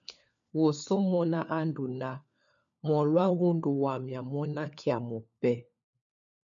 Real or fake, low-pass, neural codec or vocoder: fake; 7.2 kHz; codec, 16 kHz, 4 kbps, FunCodec, trained on LibriTTS, 50 frames a second